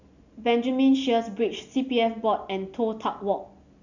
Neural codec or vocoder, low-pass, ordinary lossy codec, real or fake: none; 7.2 kHz; none; real